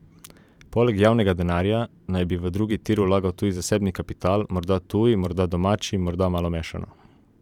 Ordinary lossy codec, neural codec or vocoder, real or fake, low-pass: none; vocoder, 44.1 kHz, 128 mel bands every 512 samples, BigVGAN v2; fake; 19.8 kHz